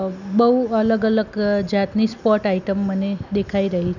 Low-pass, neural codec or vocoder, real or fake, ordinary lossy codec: 7.2 kHz; none; real; none